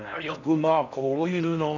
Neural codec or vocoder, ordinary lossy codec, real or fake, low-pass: codec, 16 kHz in and 24 kHz out, 0.6 kbps, FocalCodec, streaming, 4096 codes; none; fake; 7.2 kHz